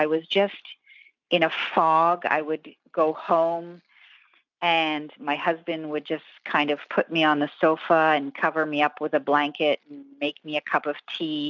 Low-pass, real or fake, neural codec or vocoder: 7.2 kHz; real; none